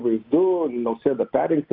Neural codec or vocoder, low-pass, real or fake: none; 5.4 kHz; real